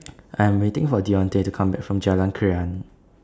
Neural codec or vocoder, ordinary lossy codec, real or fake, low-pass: none; none; real; none